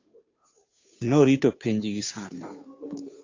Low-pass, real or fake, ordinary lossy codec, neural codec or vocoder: 7.2 kHz; fake; AAC, 48 kbps; codec, 16 kHz, 2 kbps, FunCodec, trained on Chinese and English, 25 frames a second